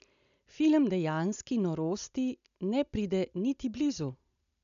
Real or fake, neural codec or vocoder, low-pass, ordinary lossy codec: real; none; 7.2 kHz; none